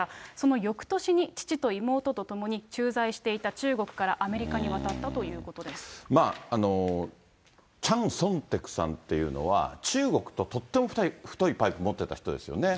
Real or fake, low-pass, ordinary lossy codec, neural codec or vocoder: real; none; none; none